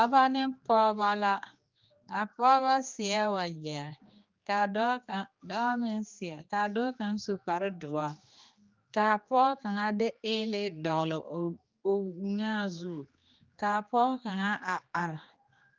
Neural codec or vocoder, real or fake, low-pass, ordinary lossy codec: codec, 16 kHz, 2 kbps, X-Codec, HuBERT features, trained on general audio; fake; 7.2 kHz; Opus, 24 kbps